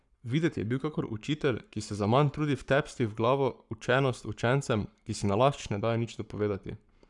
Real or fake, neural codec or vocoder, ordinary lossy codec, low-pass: fake; codec, 44.1 kHz, 7.8 kbps, Pupu-Codec; none; 10.8 kHz